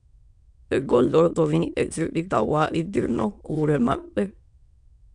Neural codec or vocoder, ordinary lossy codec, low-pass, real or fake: autoencoder, 22.05 kHz, a latent of 192 numbers a frame, VITS, trained on many speakers; MP3, 96 kbps; 9.9 kHz; fake